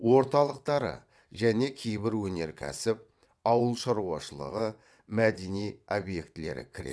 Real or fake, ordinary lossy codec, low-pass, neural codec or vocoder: fake; none; none; vocoder, 22.05 kHz, 80 mel bands, WaveNeXt